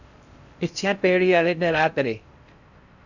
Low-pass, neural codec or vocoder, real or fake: 7.2 kHz; codec, 16 kHz in and 24 kHz out, 0.6 kbps, FocalCodec, streaming, 4096 codes; fake